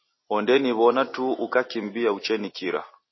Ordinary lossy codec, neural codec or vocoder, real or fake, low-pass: MP3, 24 kbps; none; real; 7.2 kHz